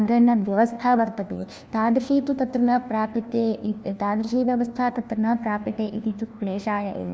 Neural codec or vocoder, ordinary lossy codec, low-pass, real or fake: codec, 16 kHz, 1 kbps, FunCodec, trained on LibriTTS, 50 frames a second; none; none; fake